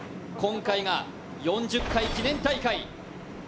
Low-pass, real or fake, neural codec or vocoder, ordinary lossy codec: none; real; none; none